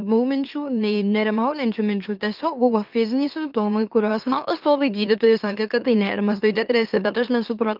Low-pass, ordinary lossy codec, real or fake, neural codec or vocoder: 5.4 kHz; Opus, 24 kbps; fake; autoencoder, 44.1 kHz, a latent of 192 numbers a frame, MeloTTS